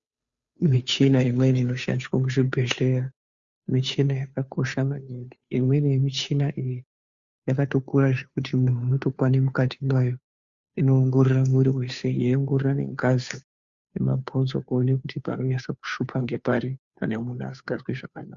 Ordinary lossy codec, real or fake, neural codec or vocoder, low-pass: Opus, 64 kbps; fake; codec, 16 kHz, 2 kbps, FunCodec, trained on Chinese and English, 25 frames a second; 7.2 kHz